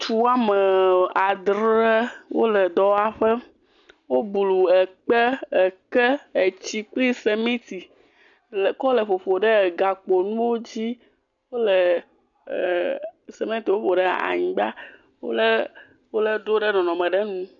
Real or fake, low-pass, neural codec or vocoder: real; 7.2 kHz; none